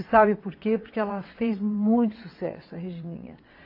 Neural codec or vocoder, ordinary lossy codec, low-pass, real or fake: vocoder, 22.05 kHz, 80 mel bands, WaveNeXt; AAC, 32 kbps; 5.4 kHz; fake